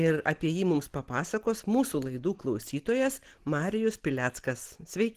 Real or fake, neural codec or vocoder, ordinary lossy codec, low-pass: real; none; Opus, 16 kbps; 14.4 kHz